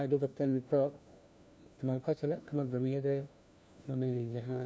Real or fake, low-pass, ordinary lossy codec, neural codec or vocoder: fake; none; none; codec, 16 kHz, 1 kbps, FunCodec, trained on LibriTTS, 50 frames a second